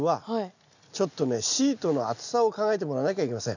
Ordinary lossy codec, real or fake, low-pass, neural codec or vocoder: none; real; 7.2 kHz; none